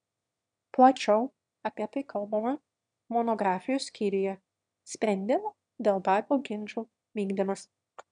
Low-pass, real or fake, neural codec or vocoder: 9.9 kHz; fake; autoencoder, 22.05 kHz, a latent of 192 numbers a frame, VITS, trained on one speaker